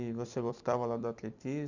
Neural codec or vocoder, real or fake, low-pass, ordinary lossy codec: vocoder, 44.1 kHz, 128 mel bands every 256 samples, BigVGAN v2; fake; 7.2 kHz; none